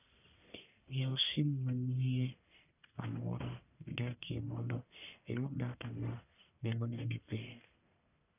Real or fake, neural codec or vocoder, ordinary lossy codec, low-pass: fake; codec, 44.1 kHz, 1.7 kbps, Pupu-Codec; none; 3.6 kHz